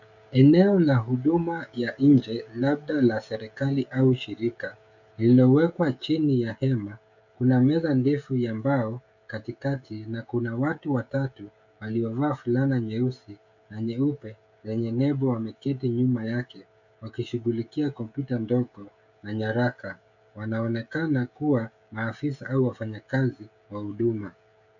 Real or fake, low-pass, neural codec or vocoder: fake; 7.2 kHz; codec, 16 kHz, 16 kbps, FreqCodec, smaller model